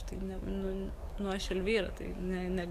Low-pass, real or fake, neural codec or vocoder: 14.4 kHz; fake; codec, 44.1 kHz, 7.8 kbps, DAC